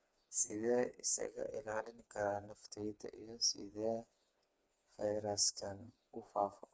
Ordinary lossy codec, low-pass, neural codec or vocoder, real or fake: none; none; codec, 16 kHz, 4 kbps, FreqCodec, smaller model; fake